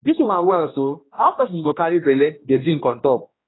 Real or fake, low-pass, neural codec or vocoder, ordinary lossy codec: fake; 7.2 kHz; codec, 16 kHz, 1 kbps, X-Codec, HuBERT features, trained on general audio; AAC, 16 kbps